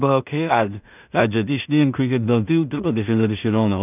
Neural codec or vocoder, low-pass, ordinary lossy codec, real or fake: codec, 16 kHz in and 24 kHz out, 0.4 kbps, LongCat-Audio-Codec, two codebook decoder; 3.6 kHz; none; fake